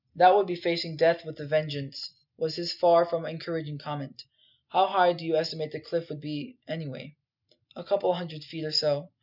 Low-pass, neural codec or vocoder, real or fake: 5.4 kHz; none; real